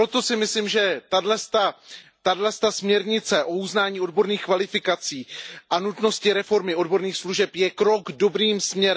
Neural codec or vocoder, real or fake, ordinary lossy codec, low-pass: none; real; none; none